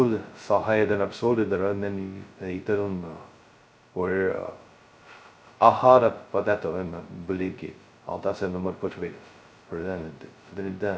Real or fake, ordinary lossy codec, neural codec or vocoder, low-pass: fake; none; codec, 16 kHz, 0.2 kbps, FocalCodec; none